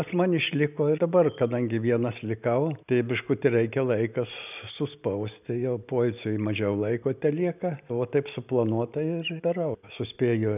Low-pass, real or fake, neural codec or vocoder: 3.6 kHz; real; none